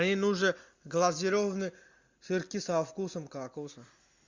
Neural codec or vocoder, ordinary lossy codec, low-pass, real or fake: none; MP3, 64 kbps; 7.2 kHz; real